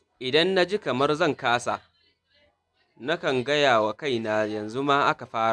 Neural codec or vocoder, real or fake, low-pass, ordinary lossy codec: none; real; none; none